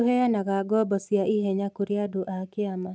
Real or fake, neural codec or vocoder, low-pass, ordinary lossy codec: real; none; none; none